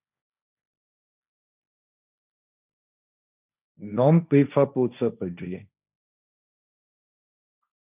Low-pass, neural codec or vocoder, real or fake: 3.6 kHz; codec, 16 kHz, 1.1 kbps, Voila-Tokenizer; fake